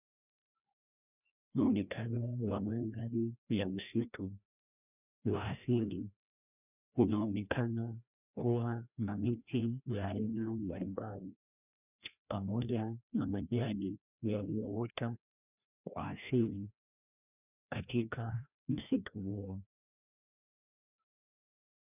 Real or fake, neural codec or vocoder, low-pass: fake; codec, 16 kHz, 1 kbps, FreqCodec, larger model; 3.6 kHz